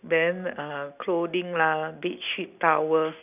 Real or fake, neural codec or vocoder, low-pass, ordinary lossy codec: real; none; 3.6 kHz; none